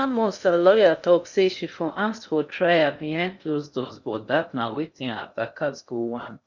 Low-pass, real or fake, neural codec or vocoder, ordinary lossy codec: 7.2 kHz; fake; codec, 16 kHz in and 24 kHz out, 0.6 kbps, FocalCodec, streaming, 2048 codes; none